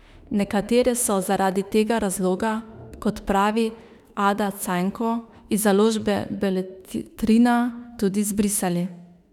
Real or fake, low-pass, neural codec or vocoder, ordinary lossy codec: fake; 19.8 kHz; autoencoder, 48 kHz, 32 numbers a frame, DAC-VAE, trained on Japanese speech; none